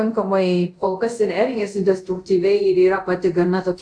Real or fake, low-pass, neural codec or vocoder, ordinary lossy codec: fake; 9.9 kHz; codec, 24 kHz, 0.5 kbps, DualCodec; AAC, 64 kbps